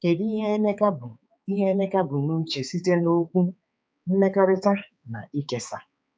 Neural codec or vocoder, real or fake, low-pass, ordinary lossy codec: codec, 16 kHz, 4 kbps, X-Codec, HuBERT features, trained on balanced general audio; fake; none; none